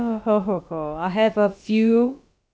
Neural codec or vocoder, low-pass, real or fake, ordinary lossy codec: codec, 16 kHz, about 1 kbps, DyCAST, with the encoder's durations; none; fake; none